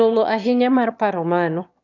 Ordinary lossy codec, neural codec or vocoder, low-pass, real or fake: none; autoencoder, 22.05 kHz, a latent of 192 numbers a frame, VITS, trained on one speaker; 7.2 kHz; fake